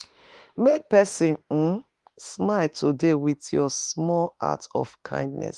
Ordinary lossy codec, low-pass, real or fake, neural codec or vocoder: Opus, 24 kbps; 10.8 kHz; fake; autoencoder, 48 kHz, 32 numbers a frame, DAC-VAE, trained on Japanese speech